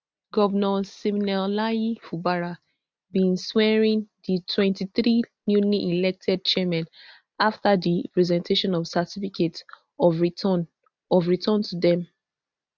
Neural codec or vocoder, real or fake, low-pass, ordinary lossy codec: none; real; none; none